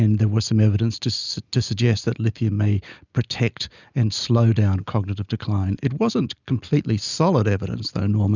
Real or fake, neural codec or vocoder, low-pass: real; none; 7.2 kHz